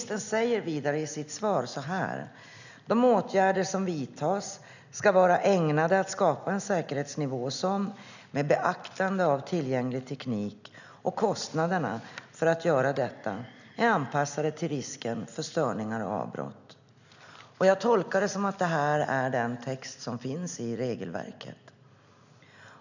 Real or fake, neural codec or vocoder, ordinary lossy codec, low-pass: real; none; none; 7.2 kHz